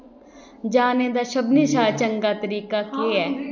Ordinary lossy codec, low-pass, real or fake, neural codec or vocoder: none; 7.2 kHz; real; none